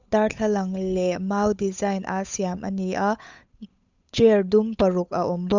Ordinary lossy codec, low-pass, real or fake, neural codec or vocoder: none; 7.2 kHz; fake; codec, 16 kHz, 8 kbps, FunCodec, trained on Chinese and English, 25 frames a second